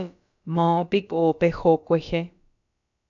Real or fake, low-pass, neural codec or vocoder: fake; 7.2 kHz; codec, 16 kHz, about 1 kbps, DyCAST, with the encoder's durations